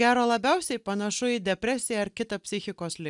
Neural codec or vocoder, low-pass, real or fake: none; 10.8 kHz; real